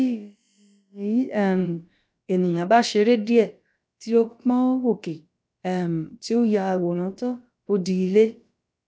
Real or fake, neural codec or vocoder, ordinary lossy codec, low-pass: fake; codec, 16 kHz, about 1 kbps, DyCAST, with the encoder's durations; none; none